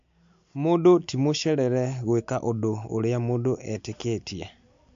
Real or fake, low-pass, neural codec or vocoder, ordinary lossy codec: real; 7.2 kHz; none; AAC, 64 kbps